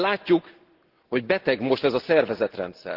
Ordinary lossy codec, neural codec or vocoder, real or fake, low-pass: Opus, 16 kbps; none; real; 5.4 kHz